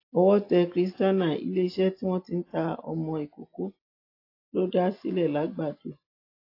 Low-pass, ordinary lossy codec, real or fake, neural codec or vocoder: 5.4 kHz; AAC, 32 kbps; real; none